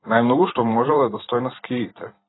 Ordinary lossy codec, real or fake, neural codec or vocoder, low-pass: AAC, 16 kbps; fake; vocoder, 44.1 kHz, 128 mel bands every 512 samples, BigVGAN v2; 7.2 kHz